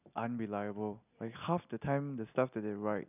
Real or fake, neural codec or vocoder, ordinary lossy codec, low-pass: real; none; none; 3.6 kHz